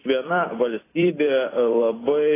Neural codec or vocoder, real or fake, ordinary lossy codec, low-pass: vocoder, 44.1 kHz, 128 mel bands every 256 samples, BigVGAN v2; fake; AAC, 16 kbps; 3.6 kHz